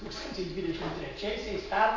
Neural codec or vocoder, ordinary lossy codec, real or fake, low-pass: none; AAC, 32 kbps; real; 7.2 kHz